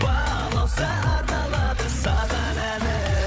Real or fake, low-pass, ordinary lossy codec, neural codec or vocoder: fake; none; none; codec, 16 kHz, 8 kbps, FreqCodec, larger model